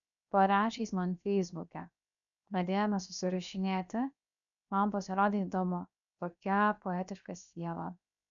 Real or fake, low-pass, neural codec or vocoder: fake; 7.2 kHz; codec, 16 kHz, 0.7 kbps, FocalCodec